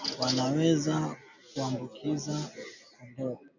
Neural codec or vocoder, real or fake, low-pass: none; real; 7.2 kHz